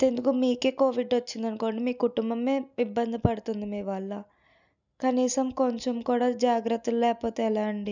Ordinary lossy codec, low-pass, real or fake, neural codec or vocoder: none; 7.2 kHz; real; none